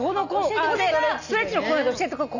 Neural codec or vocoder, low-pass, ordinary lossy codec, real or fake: none; 7.2 kHz; none; real